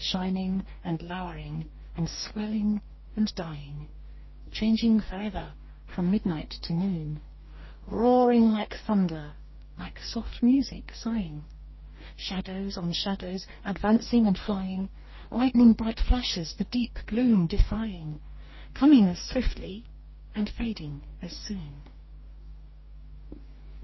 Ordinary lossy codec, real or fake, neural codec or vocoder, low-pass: MP3, 24 kbps; fake; codec, 44.1 kHz, 2.6 kbps, DAC; 7.2 kHz